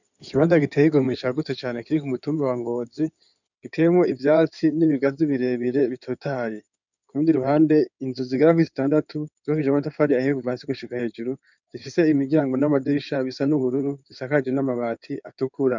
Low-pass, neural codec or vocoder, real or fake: 7.2 kHz; codec, 16 kHz in and 24 kHz out, 2.2 kbps, FireRedTTS-2 codec; fake